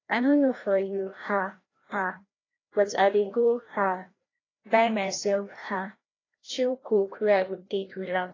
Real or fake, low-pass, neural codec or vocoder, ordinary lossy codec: fake; 7.2 kHz; codec, 16 kHz, 1 kbps, FreqCodec, larger model; AAC, 32 kbps